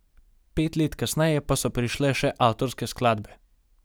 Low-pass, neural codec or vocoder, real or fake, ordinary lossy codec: none; none; real; none